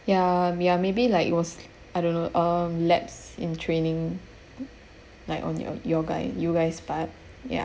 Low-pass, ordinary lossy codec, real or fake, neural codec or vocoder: none; none; real; none